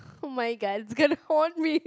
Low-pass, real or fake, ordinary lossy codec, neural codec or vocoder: none; real; none; none